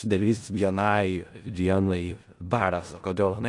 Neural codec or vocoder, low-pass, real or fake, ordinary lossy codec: codec, 16 kHz in and 24 kHz out, 0.4 kbps, LongCat-Audio-Codec, four codebook decoder; 10.8 kHz; fake; AAC, 48 kbps